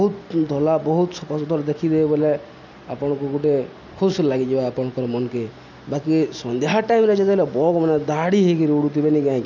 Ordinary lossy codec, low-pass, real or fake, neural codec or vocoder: none; 7.2 kHz; real; none